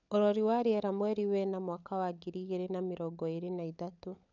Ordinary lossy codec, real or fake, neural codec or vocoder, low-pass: none; fake; codec, 16 kHz, 16 kbps, FunCodec, trained on LibriTTS, 50 frames a second; 7.2 kHz